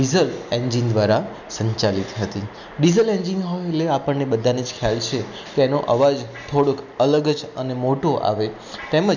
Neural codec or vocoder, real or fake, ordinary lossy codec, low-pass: none; real; none; 7.2 kHz